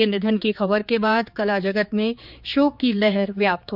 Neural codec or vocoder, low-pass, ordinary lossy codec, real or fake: codec, 16 kHz, 4 kbps, X-Codec, HuBERT features, trained on general audio; 5.4 kHz; none; fake